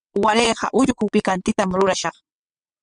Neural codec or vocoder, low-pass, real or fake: vocoder, 22.05 kHz, 80 mel bands, WaveNeXt; 9.9 kHz; fake